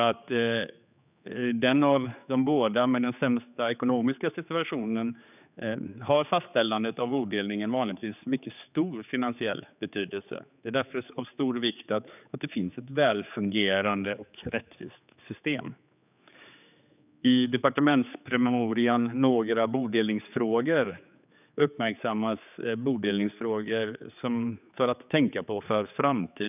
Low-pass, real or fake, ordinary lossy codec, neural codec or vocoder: 3.6 kHz; fake; none; codec, 16 kHz, 4 kbps, X-Codec, HuBERT features, trained on general audio